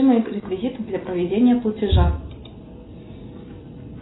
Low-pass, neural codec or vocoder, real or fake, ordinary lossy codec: 7.2 kHz; none; real; AAC, 16 kbps